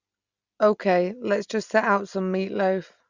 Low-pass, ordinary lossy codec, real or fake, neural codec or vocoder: 7.2 kHz; Opus, 64 kbps; real; none